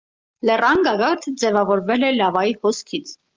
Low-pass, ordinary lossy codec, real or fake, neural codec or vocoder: 7.2 kHz; Opus, 32 kbps; real; none